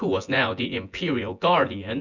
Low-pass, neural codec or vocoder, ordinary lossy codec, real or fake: 7.2 kHz; vocoder, 24 kHz, 100 mel bands, Vocos; Opus, 64 kbps; fake